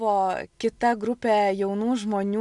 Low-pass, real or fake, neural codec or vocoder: 10.8 kHz; real; none